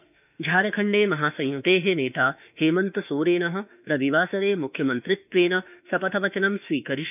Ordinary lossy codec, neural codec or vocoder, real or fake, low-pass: none; autoencoder, 48 kHz, 32 numbers a frame, DAC-VAE, trained on Japanese speech; fake; 3.6 kHz